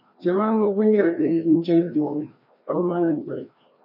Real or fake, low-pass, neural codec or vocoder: fake; 5.4 kHz; codec, 16 kHz, 1 kbps, FreqCodec, larger model